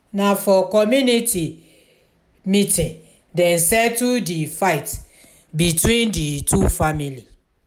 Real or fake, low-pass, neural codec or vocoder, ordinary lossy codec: real; none; none; none